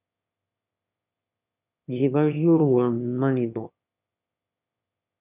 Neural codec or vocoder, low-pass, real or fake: autoencoder, 22.05 kHz, a latent of 192 numbers a frame, VITS, trained on one speaker; 3.6 kHz; fake